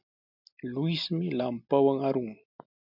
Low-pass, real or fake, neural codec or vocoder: 5.4 kHz; real; none